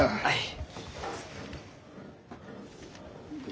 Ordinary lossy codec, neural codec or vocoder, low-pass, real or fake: none; none; none; real